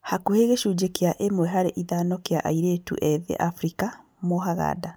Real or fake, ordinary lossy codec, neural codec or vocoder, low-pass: real; none; none; none